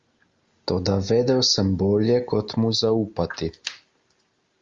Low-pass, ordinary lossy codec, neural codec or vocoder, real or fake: 7.2 kHz; Opus, 32 kbps; none; real